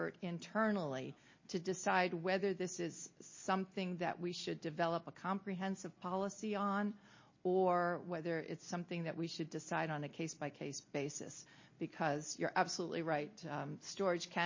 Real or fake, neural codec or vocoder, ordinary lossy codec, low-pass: real; none; MP3, 32 kbps; 7.2 kHz